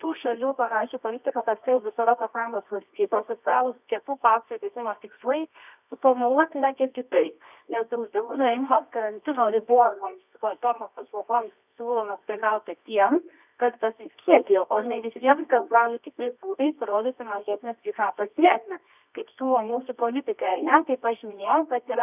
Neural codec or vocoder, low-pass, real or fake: codec, 24 kHz, 0.9 kbps, WavTokenizer, medium music audio release; 3.6 kHz; fake